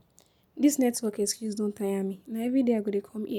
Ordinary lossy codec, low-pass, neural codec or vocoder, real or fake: none; 19.8 kHz; none; real